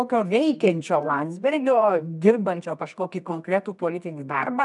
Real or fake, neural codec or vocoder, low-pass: fake; codec, 24 kHz, 0.9 kbps, WavTokenizer, medium music audio release; 10.8 kHz